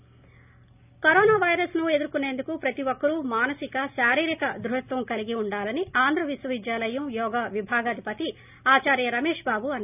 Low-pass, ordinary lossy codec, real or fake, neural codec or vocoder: 3.6 kHz; none; real; none